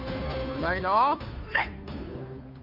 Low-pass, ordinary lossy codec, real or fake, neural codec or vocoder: 5.4 kHz; none; fake; codec, 16 kHz, 2 kbps, FunCodec, trained on Chinese and English, 25 frames a second